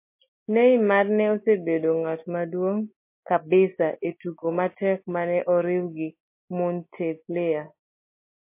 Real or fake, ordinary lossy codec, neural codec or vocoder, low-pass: real; MP3, 24 kbps; none; 3.6 kHz